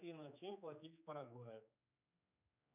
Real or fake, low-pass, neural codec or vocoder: fake; 3.6 kHz; codec, 16 kHz, 4 kbps, X-Codec, HuBERT features, trained on general audio